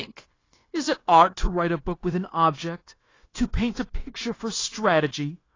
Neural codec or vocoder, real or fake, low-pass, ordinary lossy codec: codec, 16 kHz, 0.9 kbps, LongCat-Audio-Codec; fake; 7.2 kHz; AAC, 32 kbps